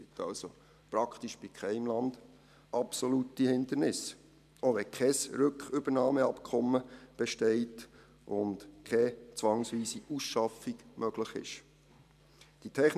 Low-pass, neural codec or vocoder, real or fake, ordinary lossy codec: 14.4 kHz; vocoder, 44.1 kHz, 128 mel bands every 512 samples, BigVGAN v2; fake; none